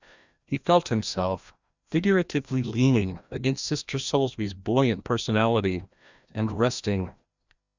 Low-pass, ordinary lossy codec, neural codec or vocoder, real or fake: 7.2 kHz; Opus, 64 kbps; codec, 16 kHz, 1 kbps, FreqCodec, larger model; fake